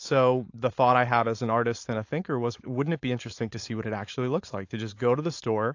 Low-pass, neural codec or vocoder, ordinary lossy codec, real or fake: 7.2 kHz; codec, 16 kHz, 4.8 kbps, FACodec; AAC, 48 kbps; fake